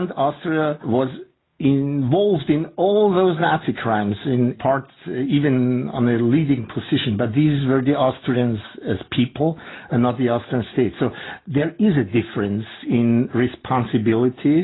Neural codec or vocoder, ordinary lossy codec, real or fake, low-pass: none; AAC, 16 kbps; real; 7.2 kHz